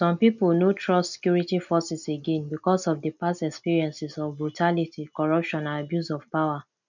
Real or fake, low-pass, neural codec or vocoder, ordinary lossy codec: real; 7.2 kHz; none; none